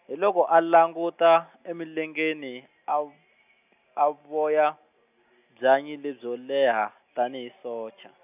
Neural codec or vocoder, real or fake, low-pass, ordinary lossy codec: none; real; 3.6 kHz; none